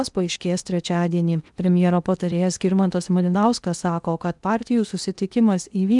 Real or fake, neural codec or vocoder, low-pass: fake; codec, 16 kHz in and 24 kHz out, 0.8 kbps, FocalCodec, streaming, 65536 codes; 10.8 kHz